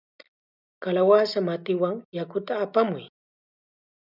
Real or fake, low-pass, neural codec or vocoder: real; 5.4 kHz; none